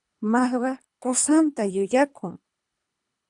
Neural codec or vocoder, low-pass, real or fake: codec, 24 kHz, 3 kbps, HILCodec; 10.8 kHz; fake